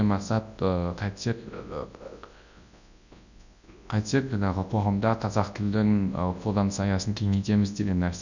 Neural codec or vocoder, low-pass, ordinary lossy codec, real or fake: codec, 24 kHz, 0.9 kbps, WavTokenizer, large speech release; 7.2 kHz; none; fake